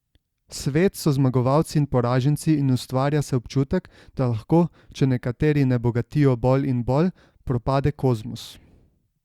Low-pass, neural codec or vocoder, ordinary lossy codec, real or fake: 19.8 kHz; none; Opus, 64 kbps; real